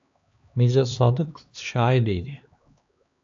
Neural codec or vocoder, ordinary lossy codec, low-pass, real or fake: codec, 16 kHz, 2 kbps, X-Codec, HuBERT features, trained on LibriSpeech; AAC, 64 kbps; 7.2 kHz; fake